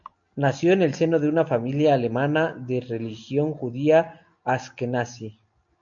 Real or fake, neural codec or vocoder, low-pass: real; none; 7.2 kHz